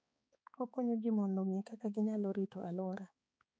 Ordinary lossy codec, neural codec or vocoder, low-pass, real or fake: none; codec, 16 kHz, 4 kbps, X-Codec, HuBERT features, trained on balanced general audio; none; fake